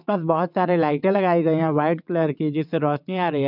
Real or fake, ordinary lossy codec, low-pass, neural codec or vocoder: fake; none; 5.4 kHz; vocoder, 22.05 kHz, 80 mel bands, WaveNeXt